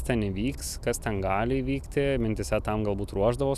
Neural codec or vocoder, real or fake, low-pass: none; real; 14.4 kHz